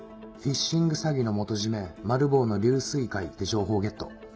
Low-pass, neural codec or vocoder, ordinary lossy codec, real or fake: none; none; none; real